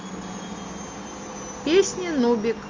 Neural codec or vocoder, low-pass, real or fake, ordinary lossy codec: none; 7.2 kHz; real; Opus, 32 kbps